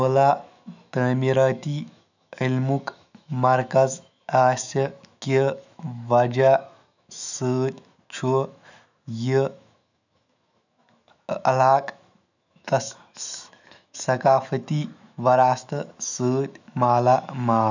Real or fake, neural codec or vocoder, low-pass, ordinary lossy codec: fake; autoencoder, 48 kHz, 128 numbers a frame, DAC-VAE, trained on Japanese speech; 7.2 kHz; none